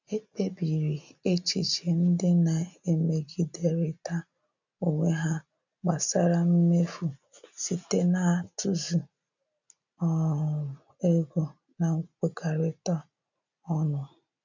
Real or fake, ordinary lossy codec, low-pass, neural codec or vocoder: real; none; 7.2 kHz; none